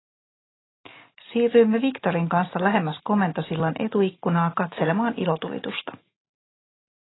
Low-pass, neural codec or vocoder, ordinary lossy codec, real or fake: 7.2 kHz; none; AAC, 16 kbps; real